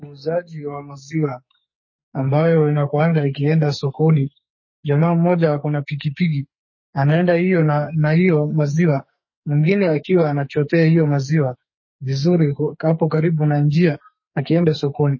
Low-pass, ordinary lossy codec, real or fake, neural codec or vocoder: 7.2 kHz; MP3, 32 kbps; fake; codec, 44.1 kHz, 2.6 kbps, SNAC